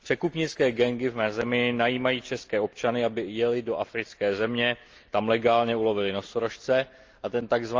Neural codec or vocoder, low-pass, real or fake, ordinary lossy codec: none; 7.2 kHz; real; Opus, 24 kbps